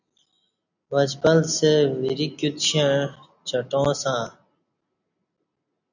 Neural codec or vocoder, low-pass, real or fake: none; 7.2 kHz; real